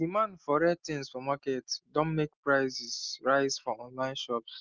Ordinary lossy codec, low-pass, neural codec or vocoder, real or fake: Opus, 32 kbps; 7.2 kHz; none; real